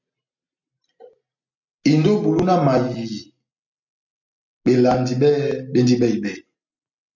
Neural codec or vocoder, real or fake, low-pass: none; real; 7.2 kHz